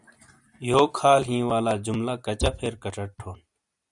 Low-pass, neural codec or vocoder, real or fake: 10.8 kHz; vocoder, 44.1 kHz, 128 mel bands every 256 samples, BigVGAN v2; fake